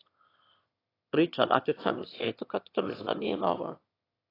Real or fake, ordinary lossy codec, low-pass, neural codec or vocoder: fake; AAC, 24 kbps; 5.4 kHz; autoencoder, 22.05 kHz, a latent of 192 numbers a frame, VITS, trained on one speaker